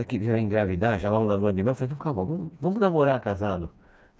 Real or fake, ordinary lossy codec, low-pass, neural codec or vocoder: fake; none; none; codec, 16 kHz, 2 kbps, FreqCodec, smaller model